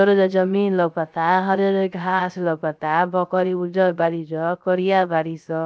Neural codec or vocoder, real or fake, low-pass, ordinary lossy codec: codec, 16 kHz, 0.3 kbps, FocalCodec; fake; none; none